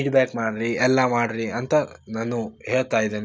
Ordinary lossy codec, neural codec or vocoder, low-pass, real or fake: none; none; none; real